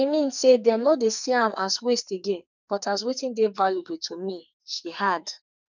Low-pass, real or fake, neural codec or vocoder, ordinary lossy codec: 7.2 kHz; fake; codec, 44.1 kHz, 2.6 kbps, SNAC; none